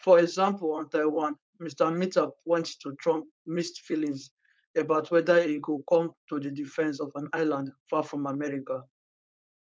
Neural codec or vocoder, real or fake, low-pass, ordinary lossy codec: codec, 16 kHz, 4.8 kbps, FACodec; fake; none; none